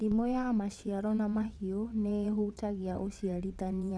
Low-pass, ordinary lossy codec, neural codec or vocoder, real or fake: none; none; vocoder, 22.05 kHz, 80 mel bands, WaveNeXt; fake